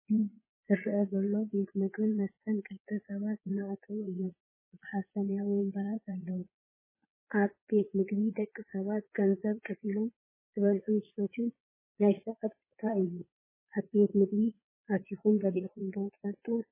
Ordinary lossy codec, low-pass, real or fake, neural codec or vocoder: MP3, 16 kbps; 3.6 kHz; fake; vocoder, 22.05 kHz, 80 mel bands, Vocos